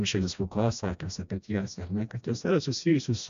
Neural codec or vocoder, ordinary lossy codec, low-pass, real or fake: codec, 16 kHz, 1 kbps, FreqCodec, smaller model; MP3, 64 kbps; 7.2 kHz; fake